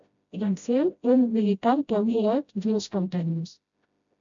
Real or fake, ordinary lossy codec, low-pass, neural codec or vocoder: fake; MP3, 64 kbps; 7.2 kHz; codec, 16 kHz, 0.5 kbps, FreqCodec, smaller model